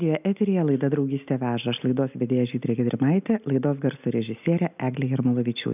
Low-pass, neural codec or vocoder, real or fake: 3.6 kHz; none; real